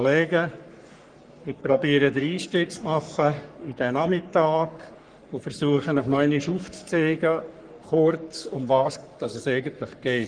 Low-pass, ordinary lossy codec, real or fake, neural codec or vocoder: 9.9 kHz; Opus, 32 kbps; fake; codec, 44.1 kHz, 3.4 kbps, Pupu-Codec